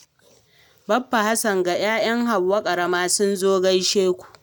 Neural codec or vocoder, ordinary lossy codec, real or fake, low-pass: none; none; real; 19.8 kHz